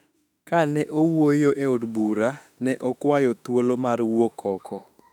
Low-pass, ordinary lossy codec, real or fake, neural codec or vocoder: 19.8 kHz; none; fake; autoencoder, 48 kHz, 32 numbers a frame, DAC-VAE, trained on Japanese speech